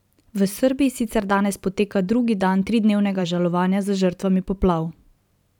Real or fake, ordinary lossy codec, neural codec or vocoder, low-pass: fake; none; vocoder, 44.1 kHz, 128 mel bands every 512 samples, BigVGAN v2; 19.8 kHz